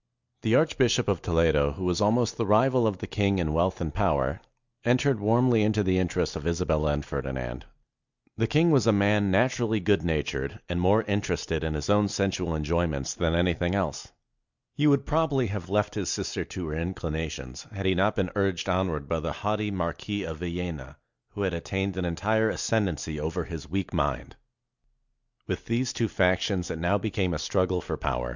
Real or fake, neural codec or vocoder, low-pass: real; none; 7.2 kHz